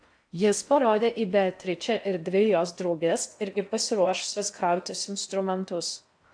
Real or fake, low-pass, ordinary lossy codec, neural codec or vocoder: fake; 9.9 kHz; AAC, 64 kbps; codec, 16 kHz in and 24 kHz out, 0.6 kbps, FocalCodec, streaming, 4096 codes